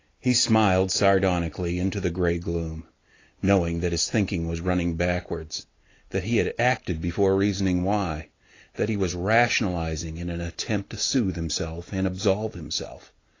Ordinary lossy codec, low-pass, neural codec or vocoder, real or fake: AAC, 32 kbps; 7.2 kHz; none; real